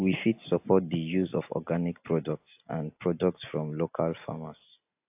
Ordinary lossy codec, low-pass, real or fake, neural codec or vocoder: AAC, 32 kbps; 3.6 kHz; real; none